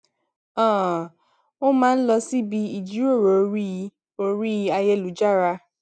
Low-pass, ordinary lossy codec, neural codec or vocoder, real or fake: 9.9 kHz; none; none; real